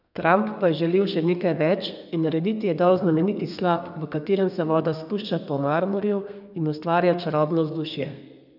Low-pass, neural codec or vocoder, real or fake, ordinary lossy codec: 5.4 kHz; codec, 32 kHz, 1.9 kbps, SNAC; fake; none